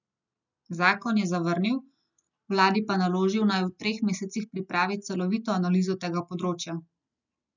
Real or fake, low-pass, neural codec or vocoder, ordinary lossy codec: real; 7.2 kHz; none; none